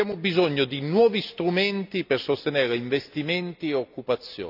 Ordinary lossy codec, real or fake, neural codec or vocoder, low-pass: none; real; none; 5.4 kHz